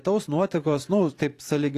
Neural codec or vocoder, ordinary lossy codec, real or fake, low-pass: vocoder, 44.1 kHz, 128 mel bands every 512 samples, BigVGAN v2; AAC, 48 kbps; fake; 14.4 kHz